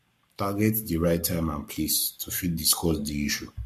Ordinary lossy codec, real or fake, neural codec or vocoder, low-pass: MP3, 64 kbps; fake; codec, 44.1 kHz, 7.8 kbps, Pupu-Codec; 14.4 kHz